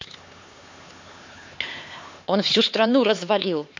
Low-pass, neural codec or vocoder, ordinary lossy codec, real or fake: 7.2 kHz; codec, 16 kHz, 4 kbps, X-Codec, HuBERT features, trained on LibriSpeech; MP3, 48 kbps; fake